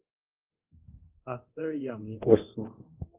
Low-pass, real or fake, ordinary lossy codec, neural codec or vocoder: 3.6 kHz; fake; Opus, 24 kbps; codec, 24 kHz, 0.9 kbps, DualCodec